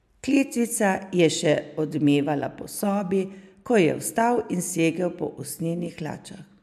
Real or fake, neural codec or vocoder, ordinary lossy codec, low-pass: real; none; MP3, 96 kbps; 14.4 kHz